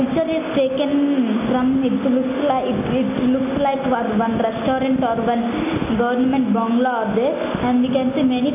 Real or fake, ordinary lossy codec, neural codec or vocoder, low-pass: real; none; none; 3.6 kHz